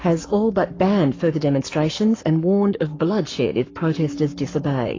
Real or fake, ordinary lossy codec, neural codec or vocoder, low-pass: fake; AAC, 32 kbps; codec, 44.1 kHz, 7.8 kbps, Pupu-Codec; 7.2 kHz